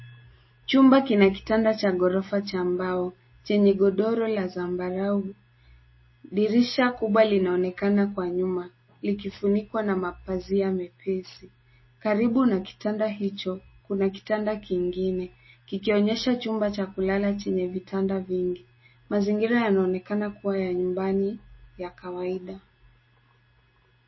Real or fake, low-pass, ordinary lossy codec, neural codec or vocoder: real; 7.2 kHz; MP3, 24 kbps; none